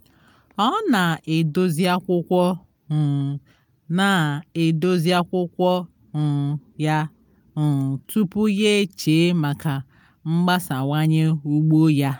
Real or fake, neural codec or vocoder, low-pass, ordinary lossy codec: real; none; none; none